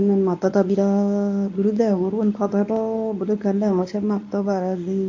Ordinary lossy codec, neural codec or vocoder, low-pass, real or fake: AAC, 48 kbps; codec, 24 kHz, 0.9 kbps, WavTokenizer, medium speech release version 1; 7.2 kHz; fake